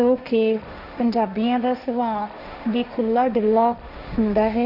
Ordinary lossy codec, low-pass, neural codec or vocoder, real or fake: none; 5.4 kHz; codec, 16 kHz, 1.1 kbps, Voila-Tokenizer; fake